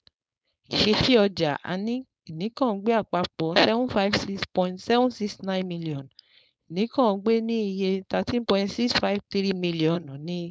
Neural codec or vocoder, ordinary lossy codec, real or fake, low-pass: codec, 16 kHz, 4.8 kbps, FACodec; none; fake; none